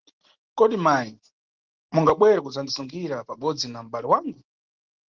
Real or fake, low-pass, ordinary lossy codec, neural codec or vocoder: real; 7.2 kHz; Opus, 16 kbps; none